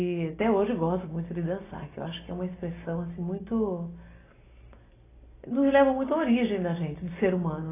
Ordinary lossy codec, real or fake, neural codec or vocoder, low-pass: AAC, 16 kbps; real; none; 3.6 kHz